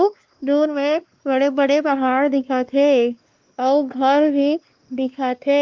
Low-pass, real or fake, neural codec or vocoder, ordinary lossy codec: 7.2 kHz; fake; codec, 16 kHz, 2 kbps, X-Codec, WavLM features, trained on Multilingual LibriSpeech; Opus, 24 kbps